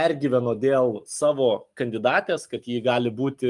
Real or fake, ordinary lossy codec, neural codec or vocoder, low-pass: real; Opus, 32 kbps; none; 10.8 kHz